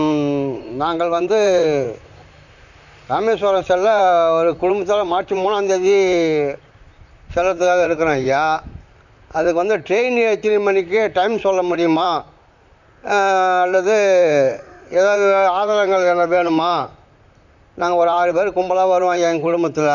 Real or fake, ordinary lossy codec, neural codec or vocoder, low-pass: fake; none; vocoder, 44.1 kHz, 128 mel bands, Pupu-Vocoder; 7.2 kHz